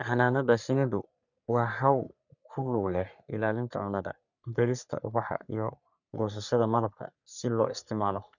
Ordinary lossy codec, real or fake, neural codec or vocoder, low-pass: none; fake; codec, 44.1 kHz, 3.4 kbps, Pupu-Codec; 7.2 kHz